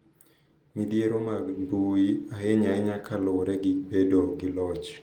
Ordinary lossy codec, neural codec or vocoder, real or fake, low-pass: Opus, 24 kbps; none; real; 19.8 kHz